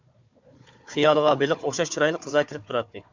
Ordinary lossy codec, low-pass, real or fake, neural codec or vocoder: MP3, 64 kbps; 7.2 kHz; fake; codec, 16 kHz, 4 kbps, FunCodec, trained on Chinese and English, 50 frames a second